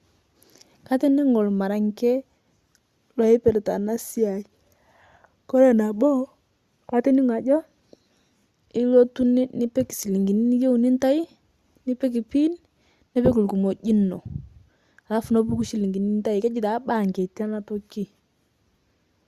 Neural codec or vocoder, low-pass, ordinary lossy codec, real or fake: none; 14.4 kHz; Opus, 64 kbps; real